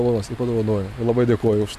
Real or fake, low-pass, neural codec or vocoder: real; 14.4 kHz; none